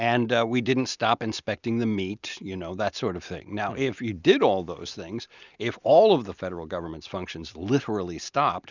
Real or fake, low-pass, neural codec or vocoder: real; 7.2 kHz; none